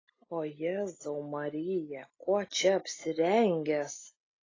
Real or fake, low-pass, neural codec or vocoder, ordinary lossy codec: real; 7.2 kHz; none; AAC, 32 kbps